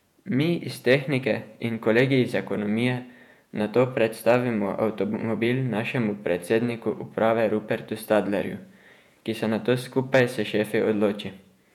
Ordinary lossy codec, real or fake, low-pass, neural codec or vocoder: none; fake; 19.8 kHz; vocoder, 48 kHz, 128 mel bands, Vocos